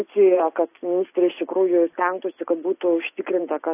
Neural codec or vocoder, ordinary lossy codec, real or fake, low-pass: none; AAC, 24 kbps; real; 3.6 kHz